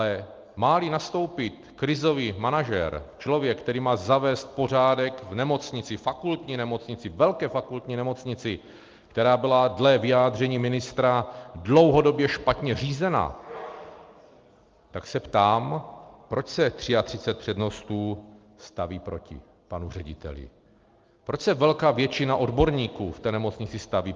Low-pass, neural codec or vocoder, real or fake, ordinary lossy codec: 7.2 kHz; none; real; Opus, 32 kbps